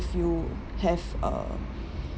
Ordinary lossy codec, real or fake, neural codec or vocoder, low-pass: none; real; none; none